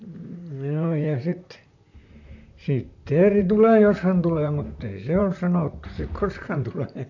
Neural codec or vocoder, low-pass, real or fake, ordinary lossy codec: vocoder, 44.1 kHz, 128 mel bands, Pupu-Vocoder; 7.2 kHz; fake; AAC, 48 kbps